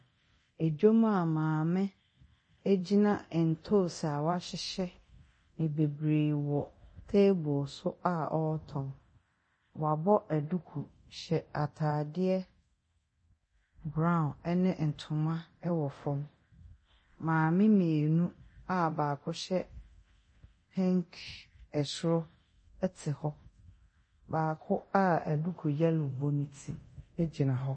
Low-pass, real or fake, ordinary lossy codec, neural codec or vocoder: 9.9 kHz; fake; MP3, 32 kbps; codec, 24 kHz, 0.9 kbps, DualCodec